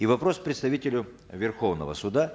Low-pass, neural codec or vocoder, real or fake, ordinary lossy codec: none; none; real; none